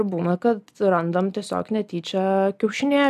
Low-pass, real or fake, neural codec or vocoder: 14.4 kHz; real; none